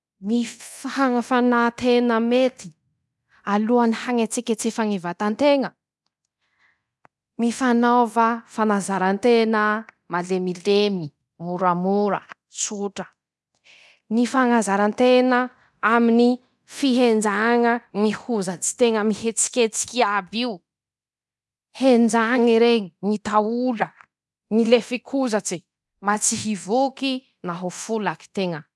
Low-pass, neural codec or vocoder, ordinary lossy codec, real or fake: none; codec, 24 kHz, 0.9 kbps, DualCodec; none; fake